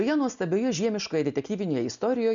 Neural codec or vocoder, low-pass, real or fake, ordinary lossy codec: none; 7.2 kHz; real; MP3, 96 kbps